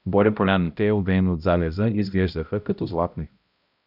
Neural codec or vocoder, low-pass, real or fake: codec, 16 kHz, 0.5 kbps, X-Codec, HuBERT features, trained on balanced general audio; 5.4 kHz; fake